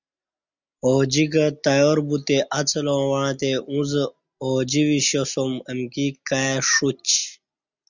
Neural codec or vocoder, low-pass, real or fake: none; 7.2 kHz; real